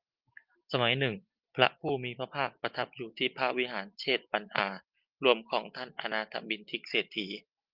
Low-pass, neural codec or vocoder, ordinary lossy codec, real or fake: 5.4 kHz; none; Opus, 32 kbps; real